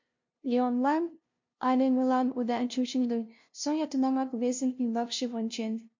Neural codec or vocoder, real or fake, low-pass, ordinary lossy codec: codec, 16 kHz, 0.5 kbps, FunCodec, trained on LibriTTS, 25 frames a second; fake; 7.2 kHz; MP3, 48 kbps